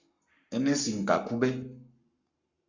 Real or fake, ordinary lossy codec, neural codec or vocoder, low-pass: fake; Opus, 64 kbps; codec, 44.1 kHz, 7.8 kbps, Pupu-Codec; 7.2 kHz